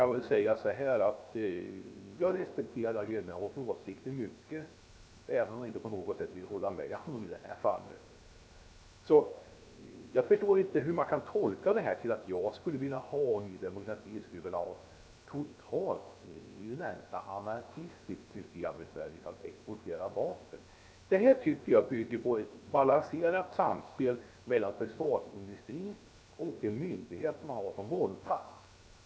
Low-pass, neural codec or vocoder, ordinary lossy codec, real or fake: none; codec, 16 kHz, 0.7 kbps, FocalCodec; none; fake